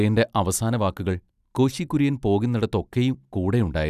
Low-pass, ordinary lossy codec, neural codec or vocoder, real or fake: 14.4 kHz; none; none; real